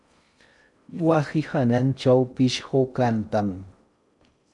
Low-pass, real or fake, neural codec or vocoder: 10.8 kHz; fake; codec, 16 kHz in and 24 kHz out, 0.6 kbps, FocalCodec, streaming, 4096 codes